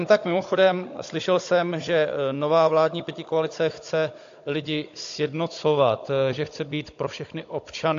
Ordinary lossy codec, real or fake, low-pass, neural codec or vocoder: AAC, 48 kbps; fake; 7.2 kHz; codec, 16 kHz, 16 kbps, FunCodec, trained on Chinese and English, 50 frames a second